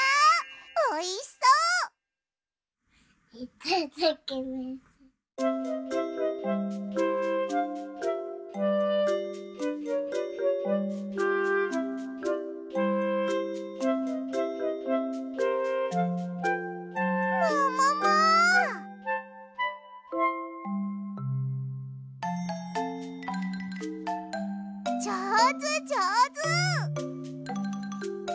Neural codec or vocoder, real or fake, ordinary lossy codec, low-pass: none; real; none; none